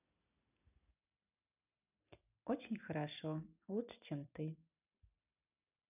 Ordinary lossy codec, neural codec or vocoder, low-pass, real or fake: none; none; 3.6 kHz; real